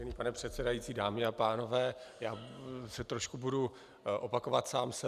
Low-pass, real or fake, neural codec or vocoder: 14.4 kHz; real; none